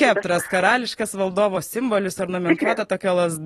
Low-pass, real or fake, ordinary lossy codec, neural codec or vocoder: 19.8 kHz; fake; AAC, 32 kbps; vocoder, 44.1 kHz, 128 mel bands every 256 samples, BigVGAN v2